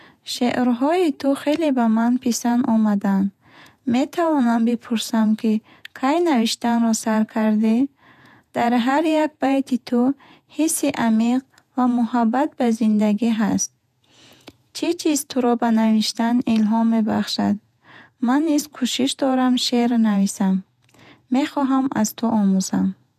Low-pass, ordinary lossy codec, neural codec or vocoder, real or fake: 14.4 kHz; none; vocoder, 48 kHz, 128 mel bands, Vocos; fake